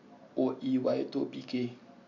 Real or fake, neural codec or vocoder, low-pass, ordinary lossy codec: real; none; 7.2 kHz; none